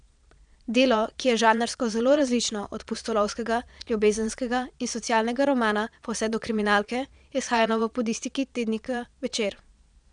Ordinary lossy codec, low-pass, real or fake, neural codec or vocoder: none; 9.9 kHz; fake; vocoder, 22.05 kHz, 80 mel bands, Vocos